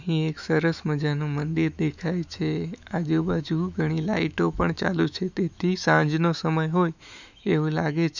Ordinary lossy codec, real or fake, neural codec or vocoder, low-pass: none; fake; autoencoder, 48 kHz, 128 numbers a frame, DAC-VAE, trained on Japanese speech; 7.2 kHz